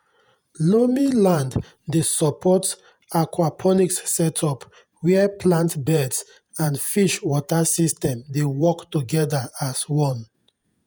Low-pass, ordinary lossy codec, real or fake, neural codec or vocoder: none; none; fake; vocoder, 48 kHz, 128 mel bands, Vocos